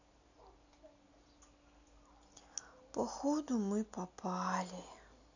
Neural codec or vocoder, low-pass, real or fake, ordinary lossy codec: none; 7.2 kHz; real; none